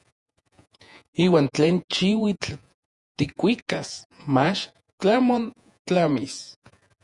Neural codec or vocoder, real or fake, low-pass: vocoder, 48 kHz, 128 mel bands, Vocos; fake; 10.8 kHz